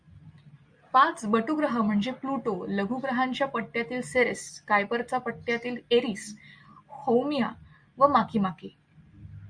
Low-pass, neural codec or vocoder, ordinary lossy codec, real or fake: 9.9 kHz; none; Opus, 64 kbps; real